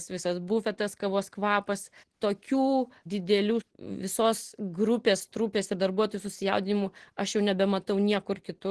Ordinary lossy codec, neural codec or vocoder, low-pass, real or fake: Opus, 16 kbps; none; 10.8 kHz; real